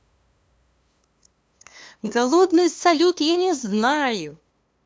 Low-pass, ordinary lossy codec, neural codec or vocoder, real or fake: none; none; codec, 16 kHz, 2 kbps, FunCodec, trained on LibriTTS, 25 frames a second; fake